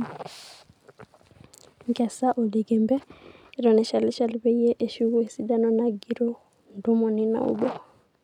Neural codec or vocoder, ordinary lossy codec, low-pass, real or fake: none; none; 19.8 kHz; real